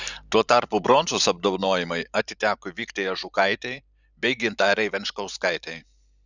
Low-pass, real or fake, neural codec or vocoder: 7.2 kHz; fake; vocoder, 44.1 kHz, 128 mel bands every 512 samples, BigVGAN v2